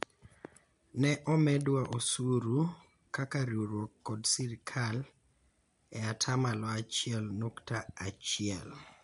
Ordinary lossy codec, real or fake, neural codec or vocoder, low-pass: MP3, 64 kbps; real; none; 10.8 kHz